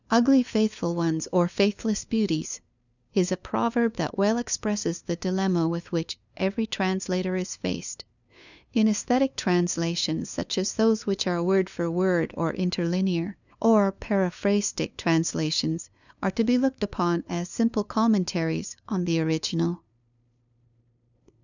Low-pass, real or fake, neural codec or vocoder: 7.2 kHz; fake; codec, 16 kHz, 2 kbps, FunCodec, trained on LibriTTS, 25 frames a second